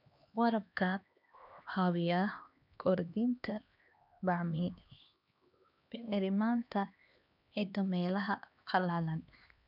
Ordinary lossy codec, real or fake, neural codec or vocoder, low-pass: none; fake; codec, 16 kHz, 2 kbps, X-Codec, HuBERT features, trained on LibriSpeech; 5.4 kHz